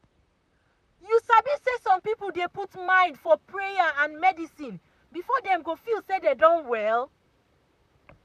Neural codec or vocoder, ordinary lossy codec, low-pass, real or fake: vocoder, 44.1 kHz, 128 mel bands, Pupu-Vocoder; none; 14.4 kHz; fake